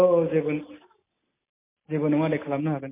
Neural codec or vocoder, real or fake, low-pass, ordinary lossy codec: none; real; 3.6 kHz; MP3, 24 kbps